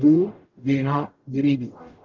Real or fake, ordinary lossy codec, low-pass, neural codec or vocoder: fake; Opus, 32 kbps; 7.2 kHz; codec, 44.1 kHz, 0.9 kbps, DAC